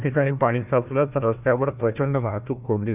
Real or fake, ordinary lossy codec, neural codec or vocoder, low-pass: fake; none; codec, 16 kHz, 1 kbps, FunCodec, trained on Chinese and English, 50 frames a second; 3.6 kHz